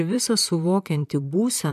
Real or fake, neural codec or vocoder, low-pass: fake; vocoder, 44.1 kHz, 128 mel bands, Pupu-Vocoder; 14.4 kHz